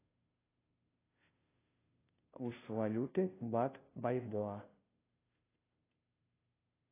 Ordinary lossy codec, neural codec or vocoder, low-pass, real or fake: AAC, 16 kbps; codec, 16 kHz, 0.5 kbps, FunCodec, trained on Chinese and English, 25 frames a second; 3.6 kHz; fake